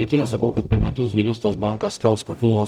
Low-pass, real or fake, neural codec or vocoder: 19.8 kHz; fake; codec, 44.1 kHz, 0.9 kbps, DAC